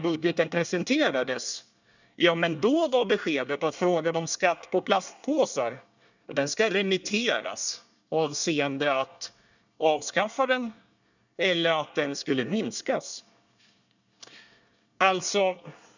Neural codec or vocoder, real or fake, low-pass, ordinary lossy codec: codec, 24 kHz, 1 kbps, SNAC; fake; 7.2 kHz; none